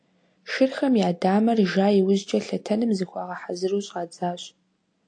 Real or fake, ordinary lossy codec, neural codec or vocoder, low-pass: real; AAC, 48 kbps; none; 9.9 kHz